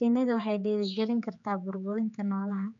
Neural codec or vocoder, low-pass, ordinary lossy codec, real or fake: codec, 16 kHz, 4 kbps, X-Codec, HuBERT features, trained on general audio; 7.2 kHz; none; fake